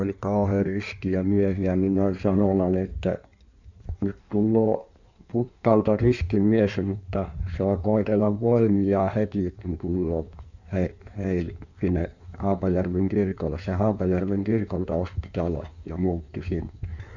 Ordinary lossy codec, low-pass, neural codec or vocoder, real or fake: none; 7.2 kHz; codec, 16 kHz in and 24 kHz out, 1.1 kbps, FireRedTTS-2 codec; fake